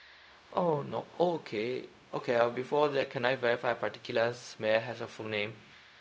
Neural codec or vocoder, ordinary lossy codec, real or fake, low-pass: codec, 16 kHz, 0.4 kbps, LongCat-Audio-Codec; none; fake; none